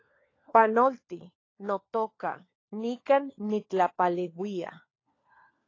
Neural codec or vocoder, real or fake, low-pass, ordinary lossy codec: codec, 16 kHz, 4 kbps, FunCodec, trained on LibriTTS, 50 frames a second; fake; 7.2 kHz; AAC, 32 kbps